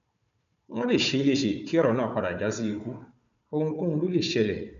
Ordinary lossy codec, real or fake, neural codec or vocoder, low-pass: none; fake; codec, 16 kHz, 4 kbps, FunCodec, trained on Chinese and English, 50 frames a second; 7.2 kHz